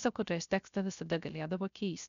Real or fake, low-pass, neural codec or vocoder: fake; 7.2 kHz; codec, 16 kHz, 0.3 kbps, FocalCodec